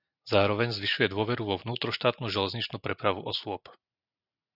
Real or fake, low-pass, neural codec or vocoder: real; 5.4 kHz; none